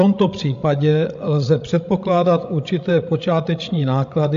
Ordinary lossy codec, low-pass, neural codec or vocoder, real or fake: AAC, 64 kbps; 7.2 kHz; codec, 16 kHz, 16 kbps, FreqCodec, larger model; fake